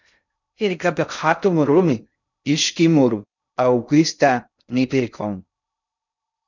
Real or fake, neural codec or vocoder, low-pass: fake; codec, 16 kHz in and 24 kHz out, 0.6 kbps, FocalCodec, streaming, 2048 codes; 7.2 kHz